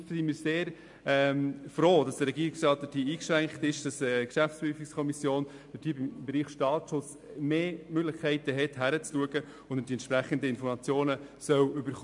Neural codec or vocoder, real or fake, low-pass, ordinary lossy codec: none; real; 10.8 kHz; MP3, 64 kbps